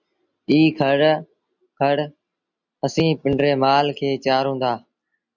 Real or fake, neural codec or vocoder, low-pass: real; none; 7.2 kHz